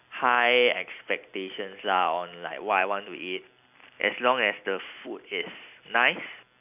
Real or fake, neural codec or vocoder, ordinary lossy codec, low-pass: real; none; none; 3.6 kHz